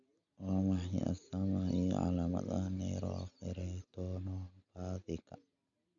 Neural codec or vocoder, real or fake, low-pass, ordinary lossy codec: none; real; 7.2 kHz; MP3, 64 kbps